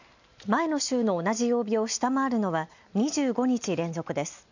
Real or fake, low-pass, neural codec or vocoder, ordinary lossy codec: real; 7.2 kHz; none; none